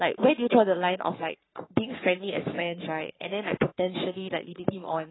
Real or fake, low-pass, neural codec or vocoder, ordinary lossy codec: fake; 7.2 kHz; codec, 44.1 kHz, 3.4 kbps, Pupu-Codec; AAC, 16 kbps